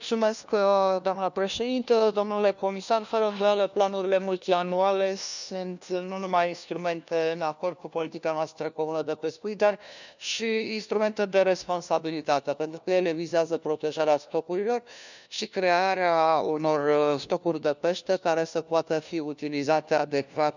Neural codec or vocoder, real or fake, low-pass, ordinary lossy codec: codec, 16 kHz, 1 kbps, FunCodec, trained on LibriTTS, 50 frames a second; fake; 7.2 kHz; none